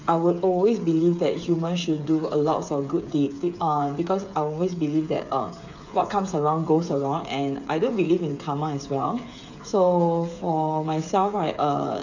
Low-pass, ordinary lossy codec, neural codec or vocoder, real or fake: 7.2 kHz; none; codec, 16 kHz, 8 kbps, FreqCodec, smaller model; fake